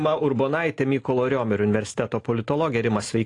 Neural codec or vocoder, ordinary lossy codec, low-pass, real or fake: none; AAC, 48 kbps; 10.8 kHz; real